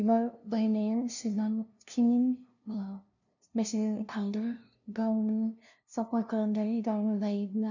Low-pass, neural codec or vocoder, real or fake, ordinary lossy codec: 7.2 kHz; codec, 16 kHz, 0.5 kbps, FunCodec, trained on LibriTTS, 25 frames a second; fake; none